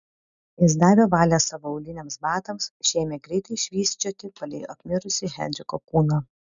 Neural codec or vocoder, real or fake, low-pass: none; real; 7.2 kHz